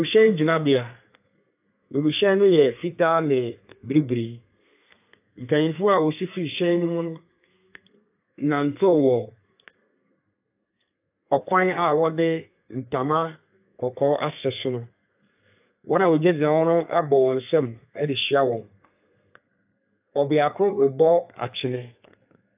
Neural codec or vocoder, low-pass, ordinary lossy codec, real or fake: codec, 32 kHz, 1.9 kbps, SNAC; 3.6 kHz; AAC, 32 kbps; fake